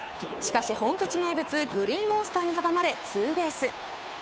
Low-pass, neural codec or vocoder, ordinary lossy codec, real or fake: none; codec, 16 kHz, 2 kbps, FunCodec, trained on Chinese and English, 25 frames a second; none; fake